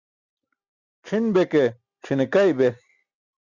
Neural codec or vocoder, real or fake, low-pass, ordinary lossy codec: none; real; 7.2 kHz; Opus, 64 kbps